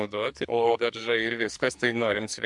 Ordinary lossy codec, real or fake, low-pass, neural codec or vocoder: MP3, 64 kbps; fake; 10.8 kHz; codec, 44.1 kHz, 2.6 kbps, SNAC